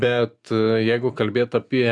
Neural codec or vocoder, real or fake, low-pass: none; real; 10.8 kHz